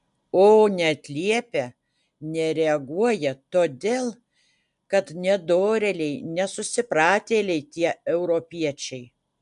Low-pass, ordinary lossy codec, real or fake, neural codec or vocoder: 10.8 kHz; AAC, 96 kbps; real; none